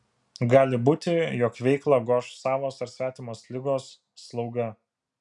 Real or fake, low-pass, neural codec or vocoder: real; 10.8 kHz; none